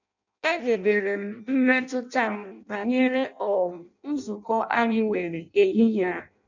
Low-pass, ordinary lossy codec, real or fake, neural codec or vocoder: 7.2 kHz; none; fake; codec, 16 kHz in and 24 kHz out, 0.6 kbps, FireRedTTS-2 codec